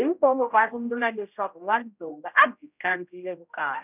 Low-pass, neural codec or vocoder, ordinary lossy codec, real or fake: 3.6 kHz; codec, 16 kHz, 0.5 kbps, X-Codec, HuBERT features, trained on general audio; none; fake